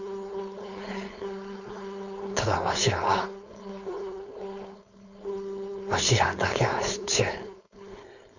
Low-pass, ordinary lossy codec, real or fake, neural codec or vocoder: 7.2 kHz; none; fake; codec, 16 kHz, 4.8 kbps, FACodec